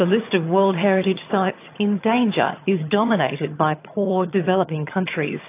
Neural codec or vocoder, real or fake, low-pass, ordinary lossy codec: vocoder, 22.05 kHz, 80 mel bands, HiFi-GAN; fake; 3.6 kHz; AAC, 24 kbps